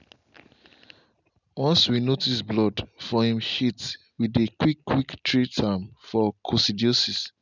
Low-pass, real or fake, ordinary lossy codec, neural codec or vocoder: 7.2 kHz; real; none; none